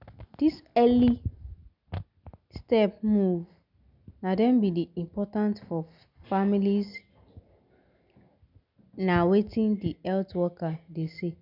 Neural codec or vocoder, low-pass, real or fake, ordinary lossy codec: none; 5.4 kHz; real; none